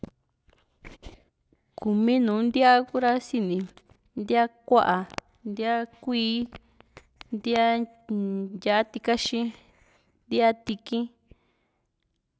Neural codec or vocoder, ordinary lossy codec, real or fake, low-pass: none; none; real; none